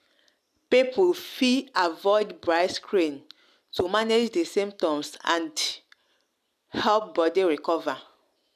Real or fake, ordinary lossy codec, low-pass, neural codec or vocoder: real; none; 14.4 kHz; none